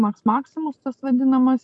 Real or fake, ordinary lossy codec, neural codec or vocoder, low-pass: real; MP3, 48 kbps; none; 10.8 kHz